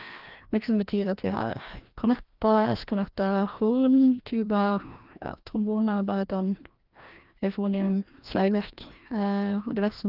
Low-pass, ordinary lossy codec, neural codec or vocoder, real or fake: 5.4 kHz; Opus, 32 kbps; codec, 16 kHz, 1 kbps, FreqCodec, larger model; fake